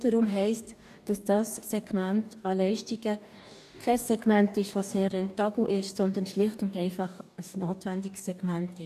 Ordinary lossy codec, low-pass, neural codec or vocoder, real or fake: none; 14.4 kHz; codec, 44.1 kHz, 2.6 kbps, DAC; fake